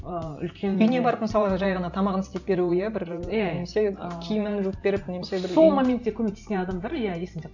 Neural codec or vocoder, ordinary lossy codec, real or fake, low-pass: vocoder, 44.1 kHz, 128 mel bands every 512 samples, BigVGAN v2; none; fake; 7.2 kHz